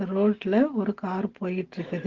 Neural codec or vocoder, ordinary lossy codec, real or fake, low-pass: none; Opus, 16 kbps; real; 7.2 kHz